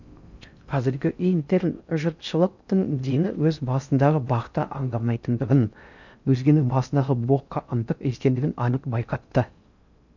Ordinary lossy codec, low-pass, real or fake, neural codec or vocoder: none; 7.2 kHz; fake; codec, 16 kHz in and 24 kHz out, 0.6 kbps, FocalCodec, streaming, 4096 codes